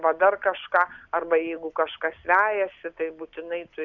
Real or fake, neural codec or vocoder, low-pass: real; none; 7.2 kHz